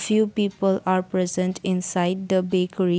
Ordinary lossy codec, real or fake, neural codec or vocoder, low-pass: none; real; none; none